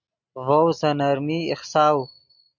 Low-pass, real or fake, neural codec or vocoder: 7.2 kHz; real; none